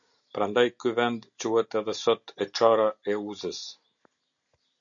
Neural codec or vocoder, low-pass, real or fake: none; 7.2 kHz; real